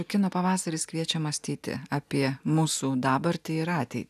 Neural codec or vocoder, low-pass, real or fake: none; 14.4 kHz; real